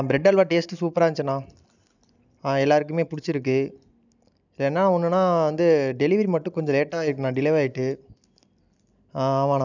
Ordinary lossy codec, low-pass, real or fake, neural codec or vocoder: none; 7.2 kHz; real; none